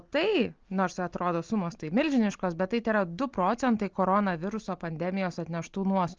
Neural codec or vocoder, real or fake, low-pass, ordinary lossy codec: none; real; 7.2 kHz; Opus, 24 kbps